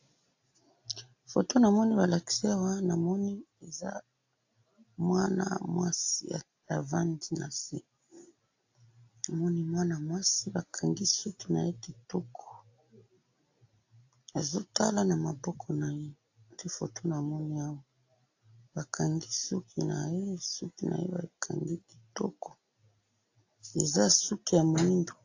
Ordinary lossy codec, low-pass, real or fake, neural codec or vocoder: AAC, 48 kbps; 7.2 kHz; real; none